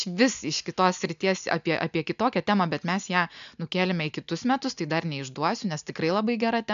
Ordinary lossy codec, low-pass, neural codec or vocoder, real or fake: AAC, 96 kbps; 7.2 kHz; none; real